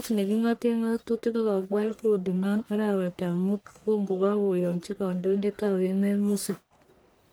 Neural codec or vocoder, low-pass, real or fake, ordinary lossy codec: codec, 44.1 kHz, 1.7 kbps, Pupu-Codec; none; fake; none